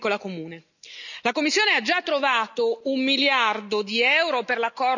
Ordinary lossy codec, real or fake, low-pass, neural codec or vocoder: none; real; 7.2 kHz; none